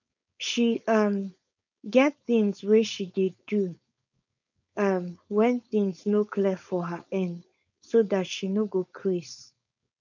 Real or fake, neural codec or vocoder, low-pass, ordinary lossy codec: fake; codec, 16 kHz, 4.8 kbps, FACodec; 7.2 kHz; none